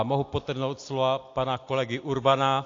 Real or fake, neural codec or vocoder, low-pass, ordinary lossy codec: real; none; 7.2 kHz; AAC, 48 kbps